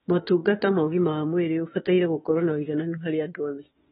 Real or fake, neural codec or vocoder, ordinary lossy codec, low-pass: fake; autoencoder, 48 kHz, 32 numbers a frame, DAC-VAE, trained on Japanese speech; AAC, 16 kbps; 19.8 kHz